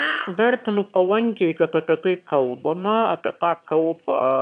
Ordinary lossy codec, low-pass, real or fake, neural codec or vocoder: AAC, 96 kbps; 9.9 kHz; fake; autoencoder, 22.05 kHz, a latent of 192 numbers a frame, VITS, trained on one speaker